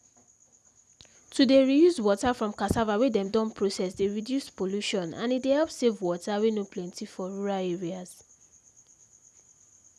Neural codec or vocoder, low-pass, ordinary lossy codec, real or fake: none; none; none; real